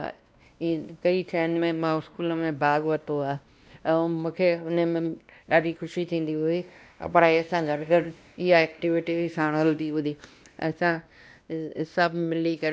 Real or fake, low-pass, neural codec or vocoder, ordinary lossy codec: fake; none; codec, 16 kHz, 1 kbps, X-Codec, WavLM features, trained on Multilingual LibriSpeech; none